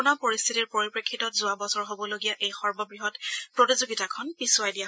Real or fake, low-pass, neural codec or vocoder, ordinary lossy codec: real; none; none; none